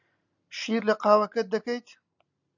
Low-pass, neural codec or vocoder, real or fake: 7.2 kHz; none; real